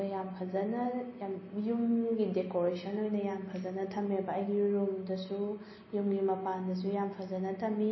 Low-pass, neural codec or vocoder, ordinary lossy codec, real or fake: 7.2 kHz; none; MP3, 24 kbps; real